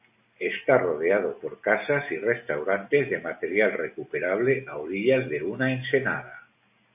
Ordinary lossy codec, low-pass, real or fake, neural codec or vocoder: AAC, 32 kbps; 3.6 kHz; real; none